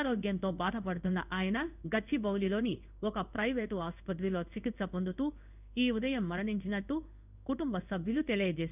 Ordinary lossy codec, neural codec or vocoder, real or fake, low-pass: none; codec, 16 kHz in and 24 kHz out, 1 kbps, XY-Tokenizer; fake; 3.6 kHz